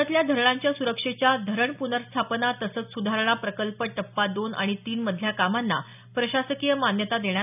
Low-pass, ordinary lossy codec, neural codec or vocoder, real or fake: 3.6 kHz; none; none; real